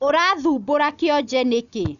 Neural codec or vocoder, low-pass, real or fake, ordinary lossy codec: none; 7.2 kHz; real; none